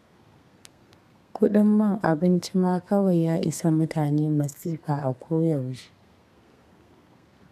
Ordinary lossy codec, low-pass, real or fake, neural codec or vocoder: none; 14.4 kHz; fake; codec, 32 kHz, 1.9 kbps, SNAC